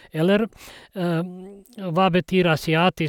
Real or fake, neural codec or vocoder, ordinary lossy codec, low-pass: real; none; none; 19.8 kHz